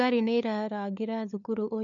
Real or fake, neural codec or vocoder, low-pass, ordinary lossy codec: fake; codec, 16 kHz, 4 kbps, FunCodec, trained on LibriTTS, 50 frames a second; 7.2 kHz; none